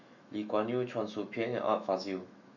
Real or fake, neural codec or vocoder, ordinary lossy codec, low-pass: fake; autoencoder, 48 kHz, 128 numbers a frame, DAC-VAE, trained on Japanese speech; none; 7.2 kHz